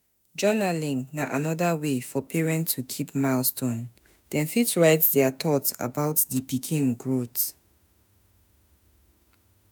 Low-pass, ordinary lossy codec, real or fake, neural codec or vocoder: none; none; fake; autoencoder, 48 kHz, 32 numbers a frame, DAC-VAE, trained on Japanese speech